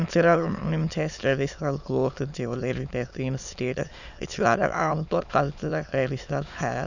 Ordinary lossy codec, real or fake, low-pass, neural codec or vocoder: none; fake; 7.2 kHz; autoencoder, 22.05 kHz, a latent of 192 numbers a frame, VITS, trained on many speakers